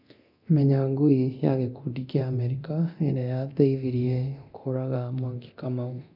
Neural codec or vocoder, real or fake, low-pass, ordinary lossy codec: codec, 24 kHz, 0.9 kbps, DualCodec; fake; 5.4 kHz; none